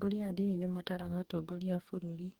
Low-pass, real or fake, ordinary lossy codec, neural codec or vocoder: 19.8 kHz; fake; Opus, 24 kbps; codec, 44.1 kHz, 2.6 kbps, DAC